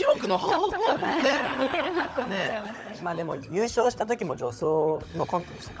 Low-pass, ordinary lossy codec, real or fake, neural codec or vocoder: none; none; fake; codec, 16 kHz, 16 kbps, FunCodec, trained on LibriTTS, 50 frames a second